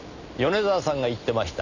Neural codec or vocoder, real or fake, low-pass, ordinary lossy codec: none; real; 7.2 kHz; none